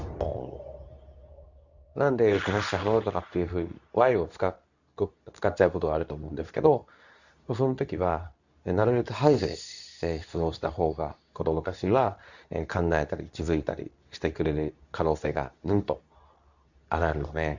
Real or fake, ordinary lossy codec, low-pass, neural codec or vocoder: fake; none; 7.2 kHz; codec, 24 kHz, 0.9 kbps, WavTokenizer, medium speech release version 2